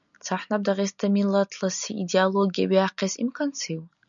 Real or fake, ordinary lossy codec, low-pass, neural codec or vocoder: real; MP3, 96 kbps; 7.2 kHz; none